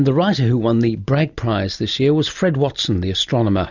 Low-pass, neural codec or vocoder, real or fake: 7.2 kHz; none; real